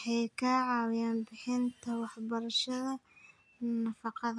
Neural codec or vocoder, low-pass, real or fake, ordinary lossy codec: none; 9.9 kHz; real; none